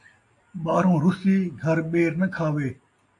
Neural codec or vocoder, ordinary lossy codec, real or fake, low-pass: none; AAC, 48 kbps; real; 10.8 kHz